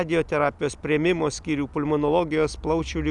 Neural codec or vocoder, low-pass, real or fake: none; 10.8 kHz; real